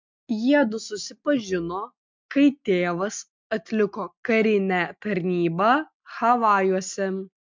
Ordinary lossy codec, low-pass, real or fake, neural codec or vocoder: MP3, 64 kbps; 7.2 kHz; real; none